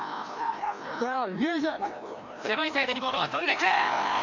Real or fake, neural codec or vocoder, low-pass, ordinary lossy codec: fake; codec, 16 kHz, 1 kbps, FreqCodec, larger model; 7.2 kHz; MP3, 64 kbps